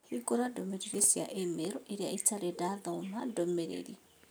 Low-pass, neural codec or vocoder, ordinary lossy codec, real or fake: none; none; none; real